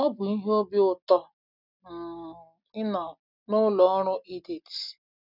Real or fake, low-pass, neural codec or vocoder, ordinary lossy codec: real; 5.4 kHz; none; none